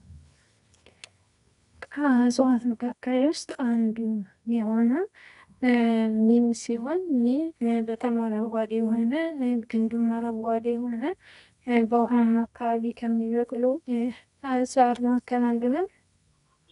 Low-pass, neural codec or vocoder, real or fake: 10.8 kHz; codec, 24 kHz, 0.9 kbps, WavTokenizer, medium music audio release; fake